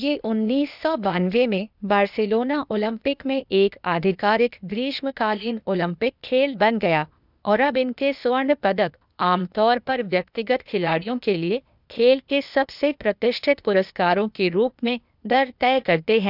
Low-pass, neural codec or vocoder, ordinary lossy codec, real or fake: 5.4 kHz; codec, 16 kHz, 0.8 kbps, ZipCodec; none; fake